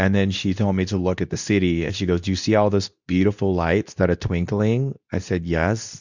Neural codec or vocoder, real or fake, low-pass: codec, 24 kHz, 0.9 kbps, WavTokenizer, medium speech release version 2; fake; 7.2 kHz